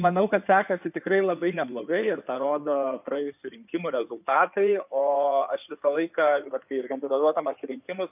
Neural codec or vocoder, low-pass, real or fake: codec, 16 kHz in and 24 kHz out, 2.2 kbps, FireRedTTS-2 codec; 3.6 kHz; fake